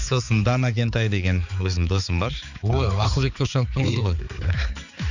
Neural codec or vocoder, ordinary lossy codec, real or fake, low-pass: codec, 16 kHz, 4 kbps, X-Codec, HuBERT features, trained on balanced general audio; none; fake; 7.2 kHz